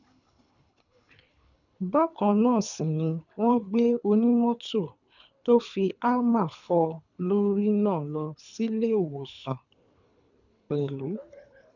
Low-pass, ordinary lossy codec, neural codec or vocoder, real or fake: 7.2 kHz; none; codec, 24 kHz, 3 kbps, HILCodec; fake